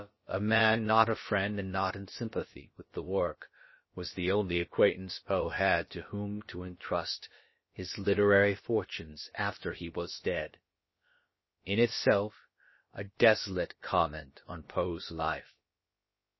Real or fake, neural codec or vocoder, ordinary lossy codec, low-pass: fake; codec, 16 kHz, about 1 kbps, DyCAST, with the encoder's durations; MP3, 24 kbps; 7.2 kHz